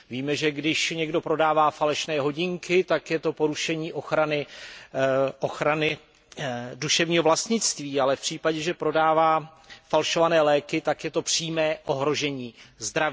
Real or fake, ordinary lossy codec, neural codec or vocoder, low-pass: real; none; none; none